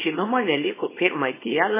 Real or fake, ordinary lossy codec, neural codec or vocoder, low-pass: fake; MP3, 16 kbps; codec, 24 kHz, 0.9 kbps, WavTokenizer, small release; 3.6 kHz